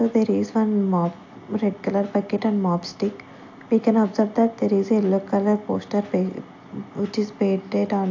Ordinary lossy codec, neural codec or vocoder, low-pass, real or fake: AAC, 48 kbps; none; 7.2 kHz; real